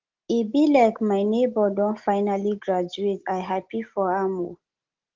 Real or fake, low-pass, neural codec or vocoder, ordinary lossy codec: real; 7.2 kHz; none; Opus, 16 kbps